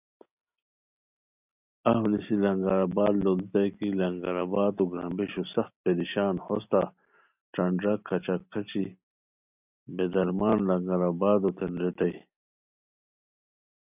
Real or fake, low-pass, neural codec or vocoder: real; 3.6 kHz; none